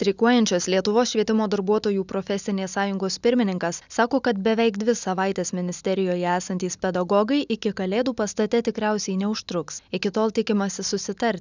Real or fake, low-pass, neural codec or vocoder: real; 7.2 kHz; none